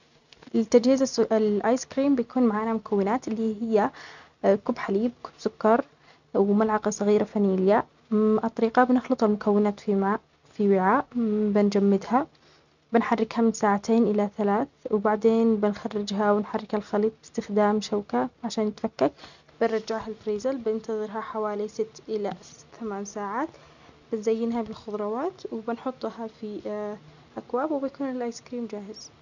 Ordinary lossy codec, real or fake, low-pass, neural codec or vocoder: none; real; 7.2 kHz; none